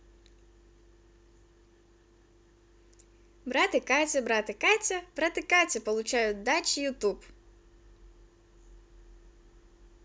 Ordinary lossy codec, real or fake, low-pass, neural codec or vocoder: none; real; none; none